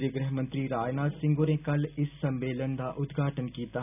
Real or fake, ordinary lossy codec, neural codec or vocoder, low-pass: real; none; none; 3.6 kHz